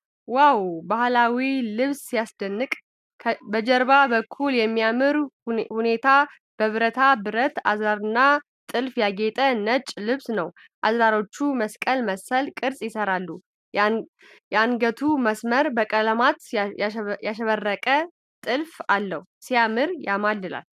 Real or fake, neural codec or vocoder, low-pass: real; none; 14.4 kHz